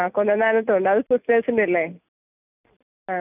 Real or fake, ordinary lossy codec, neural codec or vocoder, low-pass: real; none; none; 3.6 kHz